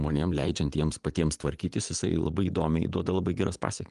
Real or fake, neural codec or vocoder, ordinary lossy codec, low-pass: fake; vocoder, 24 kHz, 100 mel bands, Vocos; Opus, 32 kbps; 10.8 kHz